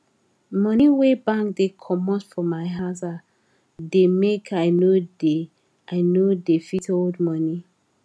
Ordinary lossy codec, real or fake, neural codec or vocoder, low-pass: none; real; none; none